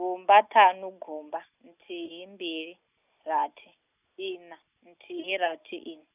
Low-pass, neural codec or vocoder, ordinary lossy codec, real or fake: 3.6 kHz; none; none; real